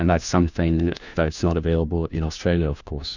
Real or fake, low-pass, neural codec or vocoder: fake; 7.2 kHz; codec, 16 kHz, 1 kbps, FunCodec, trained on LibriTTS, 50 frames a second